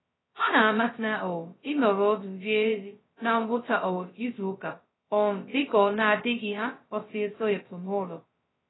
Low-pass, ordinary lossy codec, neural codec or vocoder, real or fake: 7.2 kHz; AAC, 16 kbps; codec, 16 kHz, 0.2 kbps, FocalCodec; fake